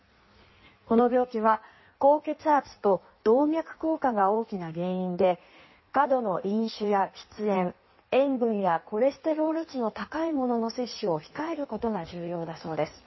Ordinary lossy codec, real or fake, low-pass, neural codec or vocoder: MP3, 24 kbps; fake; 7.2 kHz; codec, 16 kHz in and 24 kHz out, 1.1 kbps, FireRedTTS-2 codec